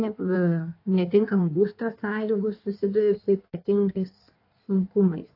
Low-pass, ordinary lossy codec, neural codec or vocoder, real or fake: 5.4 kHz; AAC, 32 kbps; codec, 16 kHz in and 24 kHz out, 1.1 kbps, FireRedTTS-2 codec; fake